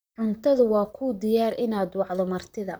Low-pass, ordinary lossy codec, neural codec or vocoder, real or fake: none; none; vocoder, 44.1 kHz, 128 mel bands, Pupu-Vocoder; fake